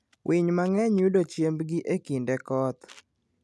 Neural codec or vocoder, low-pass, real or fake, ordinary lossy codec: none; none; real; none